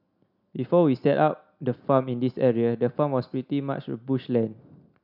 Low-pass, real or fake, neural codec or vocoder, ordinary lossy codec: 5.4 kHz; real; none; none